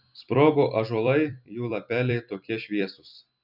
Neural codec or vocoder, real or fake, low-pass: vocoder, 44.1 kHz, 128 mel bands every 256 samples, BigVGAN v2; fake; 5.4 kHz